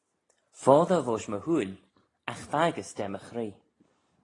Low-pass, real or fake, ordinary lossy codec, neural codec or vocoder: 10.8 kHz; real; AAC, 32 kbps; none